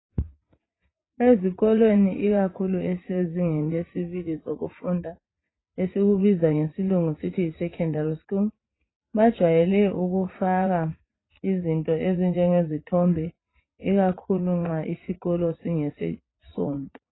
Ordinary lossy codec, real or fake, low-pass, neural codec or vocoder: AAC, 16 kbps; fake; 7.2 kHz; vocoder, 44.1 kHz, 80 mel bands, Vocos